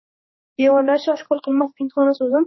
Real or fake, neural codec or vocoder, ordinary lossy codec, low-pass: fake; codec, 16 kHz, 2 kbps, X-Codec, HuBERT features, trained on general audio; MP3, 24 kbps; 7.2 kHz